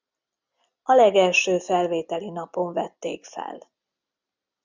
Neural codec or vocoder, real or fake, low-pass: none; real; 7.2 kHz